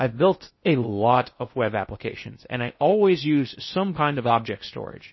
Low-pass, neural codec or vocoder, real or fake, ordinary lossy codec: 7.2 kHz; codec, 16 kHz in and 24 kHz out, 0.6 kbps, FocalCodec, streaming, 2048 codes; fake; MP3, 24 kbps